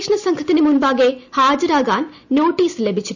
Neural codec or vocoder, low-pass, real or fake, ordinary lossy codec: none; 7.2 kHz; real; none